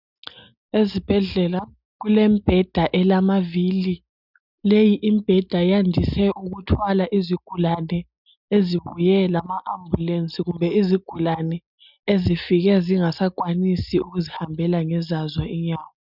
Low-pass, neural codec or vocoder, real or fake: 5.4 kHz; none; real